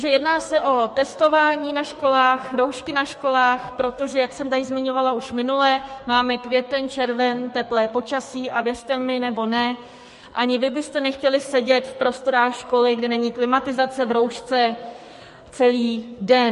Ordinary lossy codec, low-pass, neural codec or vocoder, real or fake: MP3, 48 kbps; 14.4 kHz; codec, 44.1 kHz, 2.6 kbps, SNAC; fake